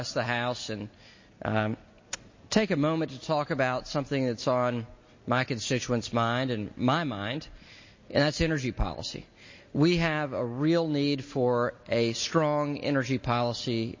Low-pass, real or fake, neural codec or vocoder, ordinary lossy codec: 7.2 kHz; real; none; MP3, 32 kbps